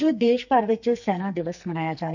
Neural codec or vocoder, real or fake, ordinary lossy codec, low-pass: codec, 44.1 kHz, 2.6 kbps, SNAC; fake; none; 7.2 kHz